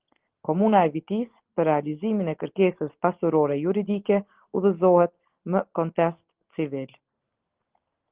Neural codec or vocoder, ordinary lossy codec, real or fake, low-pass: none; Opus, 16 kbps; real; 3.6 kHz